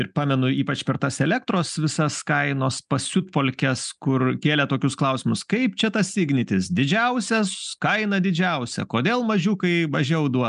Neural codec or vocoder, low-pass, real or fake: none; 10.8 kHz; real